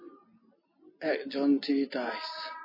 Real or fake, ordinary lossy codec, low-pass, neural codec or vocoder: real; MP3, 24 kbps; 5.4 kHz; none